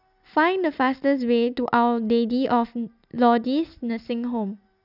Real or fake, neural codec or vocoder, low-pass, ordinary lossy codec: real; none; 5.4 kHz; none